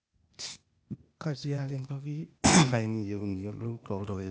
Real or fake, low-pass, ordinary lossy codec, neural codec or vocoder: fake; none; none; codec, 16 kHz, 0.8 kbps, ZipCodec